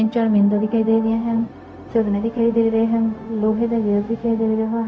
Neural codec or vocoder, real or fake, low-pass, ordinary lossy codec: codec, 16 kHz, 0.4 kbps, LongCat-Audio-Codec; fake; none; none